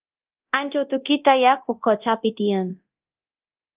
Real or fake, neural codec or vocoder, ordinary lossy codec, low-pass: fake; codec, 24 kHz, 0.9 kbps, DualCodec; Opus, 32 kbps; 3.6 kHz